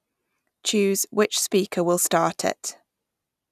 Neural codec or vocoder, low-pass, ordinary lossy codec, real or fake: none; 14.4 kHz; none; real